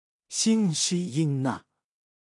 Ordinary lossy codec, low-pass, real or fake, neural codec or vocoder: AAC, 64 kbps; 10.8 kHz; fake; codec, 16 kHz in and 24 kHz out, 0.4 kbps, LongCat-Audio-Codec, two codebook decoder